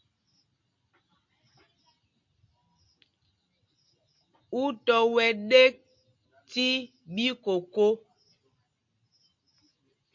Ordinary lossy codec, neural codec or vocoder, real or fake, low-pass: MP3, 48 kbps; none; real; 7.2 kHz